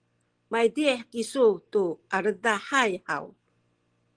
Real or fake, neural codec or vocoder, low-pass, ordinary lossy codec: real; none; 9.9 kHz; Opus, 16 kbps